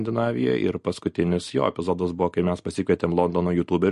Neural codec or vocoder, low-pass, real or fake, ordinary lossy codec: none; 14.4 kHz; real; MP3, 48 kbps